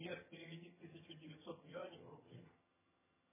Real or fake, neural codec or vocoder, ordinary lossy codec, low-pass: fake; vocoder, 22.05 kHz, 80 mel bands, HiFi-GAN; MP3, 16 kbps; 3.6 kHz